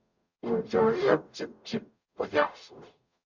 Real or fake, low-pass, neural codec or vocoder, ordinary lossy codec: fake; 7.2 kHz; codec, 44.1 kHz, 0.9 kbps, DAC; MP3, 64 kbps